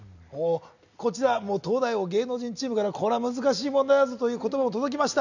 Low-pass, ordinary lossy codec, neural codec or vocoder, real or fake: 7.2 kHz; none; none; real